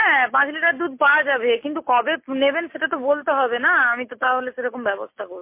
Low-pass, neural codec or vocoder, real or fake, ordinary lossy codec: 3.6 kHz; none; real; MP3, 24 kbps